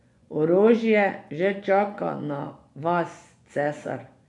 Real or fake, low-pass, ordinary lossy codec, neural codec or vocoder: fake; 10.8 kHz; none; vocoder, 24 kHz, 100 mel bands, Vocos